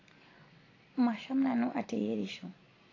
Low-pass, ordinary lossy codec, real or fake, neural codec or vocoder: 7.2 kHz; AAC, 32 kbps; real; none